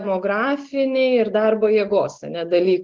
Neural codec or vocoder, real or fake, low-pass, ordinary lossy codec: none; real; 7.2 kHz; Opus, 16 kbps